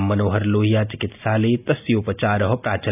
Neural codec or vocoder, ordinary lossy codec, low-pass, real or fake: none; AAC, 32 kbps; 3.6 kHz; real